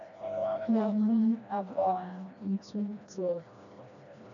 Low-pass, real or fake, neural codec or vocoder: 7.2 kHz; fake; codec, 16 kHz, 1 kbps, FreqCodec, smaller model